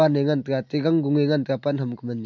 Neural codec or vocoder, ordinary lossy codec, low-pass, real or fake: none; MP3, 64 kbps; 7.2 kHz; real